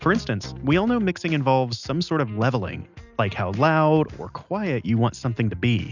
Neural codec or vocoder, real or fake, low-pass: none; real; 7.2 kHz